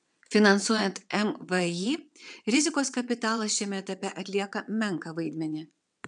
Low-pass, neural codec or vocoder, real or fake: 9.9 kHz; vocoder, 22.05 kHz, 80 mel bands, WaveNeXt; fake